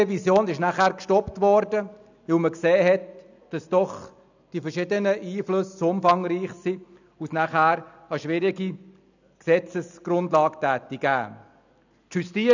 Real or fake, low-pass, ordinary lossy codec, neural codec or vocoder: real; 7.2 kHz; none; none